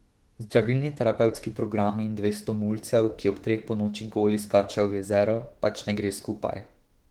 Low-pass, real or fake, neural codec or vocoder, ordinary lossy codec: 19.8 kHz; fake; autoencoder, 48 kHz, 32 numbers a frame, DAC-VAE, trained on Japanese speech; Opus, 16 kbps